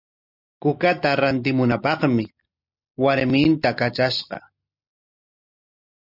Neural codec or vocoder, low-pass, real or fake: none; 5.4 kHz; real